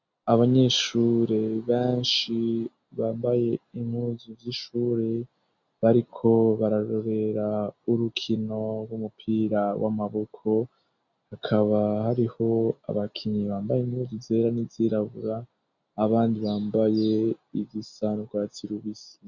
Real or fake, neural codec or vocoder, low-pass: real; none; 7.2 kHz